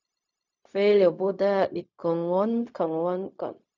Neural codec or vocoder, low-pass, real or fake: codec, 16 kHz, 0.4 kbps, LongCat-Audio-Codec; 7.2 kHz; fake